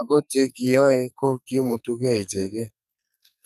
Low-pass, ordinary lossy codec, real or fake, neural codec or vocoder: 14.4 kHz; none; fake; codec, 44.1 kHz, 2.6 kbps, SNAC